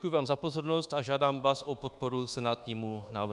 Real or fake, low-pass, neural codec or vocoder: fake; 10.8 kHz; codec, 24 kHz, 1.2 kbps, DualCodec